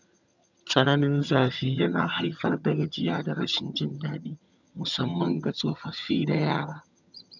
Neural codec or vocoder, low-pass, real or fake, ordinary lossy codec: vocoder, 22.05 kHz, 80 mel bands, HiFi-GAN; 7.2 kHz; fake; none